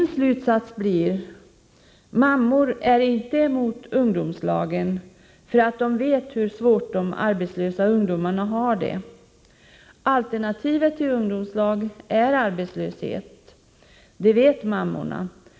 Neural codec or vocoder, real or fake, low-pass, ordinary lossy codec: none; real; none; none